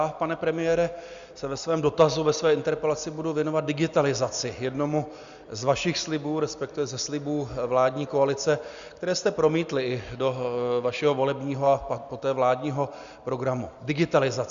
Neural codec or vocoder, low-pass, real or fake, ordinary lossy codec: none; 7.2 kHz; real; Opus, 64 kbps